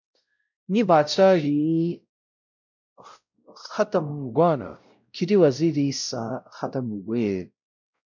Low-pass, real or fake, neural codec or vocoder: 7.2 kHz; fake; codec, 16 kHz, 0.5 kbps, X-Codec, WavLM features, trained on Multilingual LibriSpeech